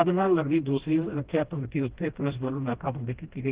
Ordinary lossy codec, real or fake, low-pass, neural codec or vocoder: Opus, 16 kbps; fake; 3.6 kHz; codec, 16 kHz, 1 kbps, FreqCodec, smaller model